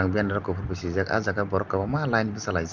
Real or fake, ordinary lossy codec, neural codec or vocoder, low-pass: real; Opus, 32 kbps; none; 7.2 kHz